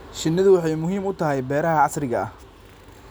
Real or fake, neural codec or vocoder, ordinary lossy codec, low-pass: real; none; none; none